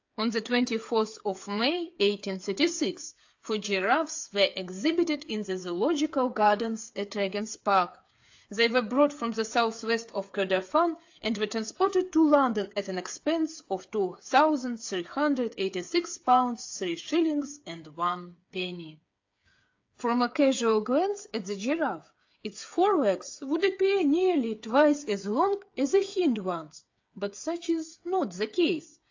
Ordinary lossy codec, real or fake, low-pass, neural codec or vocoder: AAC, 48 kbps; fake; 7.2 kHz; codec, 16 kHz, 8 kbps, FreqCodec, smaller model